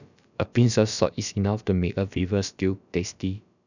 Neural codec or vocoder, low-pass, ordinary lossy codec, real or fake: codec, 16 kHz, about 1 kbps, DyCAST, with the encoder's durations; 7.2 kHz; none; fake